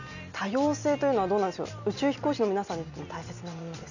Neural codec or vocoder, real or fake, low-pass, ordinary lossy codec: none; real; 7.2 kHz; none